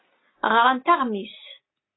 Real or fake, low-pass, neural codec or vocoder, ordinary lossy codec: real; 7.2 kHz; none; AAC, 16 kbps